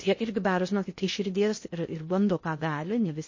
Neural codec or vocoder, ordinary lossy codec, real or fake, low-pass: codec, 16 kHz in and 24 kHz out, 0.6 kbps, FocalCodec, streaming, 2048 codes; MP3, 32 kbps; fake; 7.2 kHz